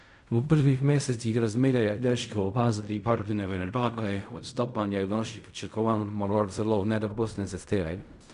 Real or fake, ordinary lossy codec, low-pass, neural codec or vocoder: fake; none; 10.8 kHz; codec, 16 kHz in and 24 kHz out, 0.4 kbps, LongCat-Audio-Codec, fine tuned four codebook decoder